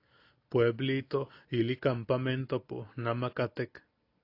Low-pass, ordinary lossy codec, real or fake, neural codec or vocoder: 5.4 kHz; AAC, 32 kbps; real; none